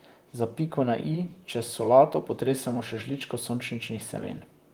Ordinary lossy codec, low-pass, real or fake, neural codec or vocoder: Opus, 24 kbps; 19.8 kHz; fake; vocoder, 44.1 kHz, 128 mel bands, Pupu-Vocoder